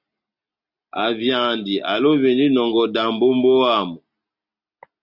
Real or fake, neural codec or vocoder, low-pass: real; none; 5.4 kHz